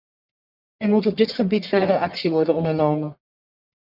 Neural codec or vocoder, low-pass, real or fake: codec, 44.1 kHz, 1.7 kbps, Pupu-Codec; 5.4 kHz; fake